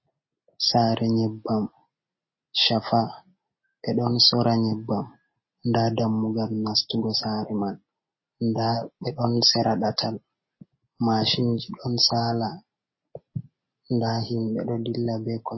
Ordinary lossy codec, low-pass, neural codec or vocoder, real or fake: MP3, 24 kbps; 7.2 kHz; none; real